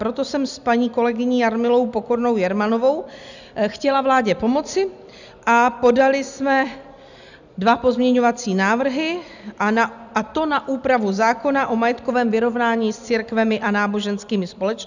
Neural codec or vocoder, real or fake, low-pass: none; real; 7.2 kHz